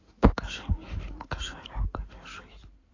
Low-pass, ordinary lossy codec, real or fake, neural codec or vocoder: 7.2 kHz; AAC, 32 kbps; fake; codec, 16 kHz in and 24 kHz out, 2.2 kbps, FireRedTTS-2 codec